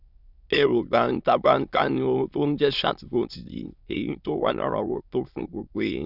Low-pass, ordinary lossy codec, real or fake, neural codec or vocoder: 5.4 kHz; none; fake; autoencoder, 22.05 kHz, a latent of 192 numbers a frame, VITS, trained on many speakers